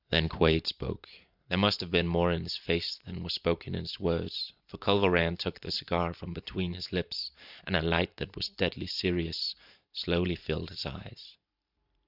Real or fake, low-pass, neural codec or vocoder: fake; 5.4 kHz; vocoder, 44.1 kHz, 128 mel bands every 512 samples, BigVGAN v2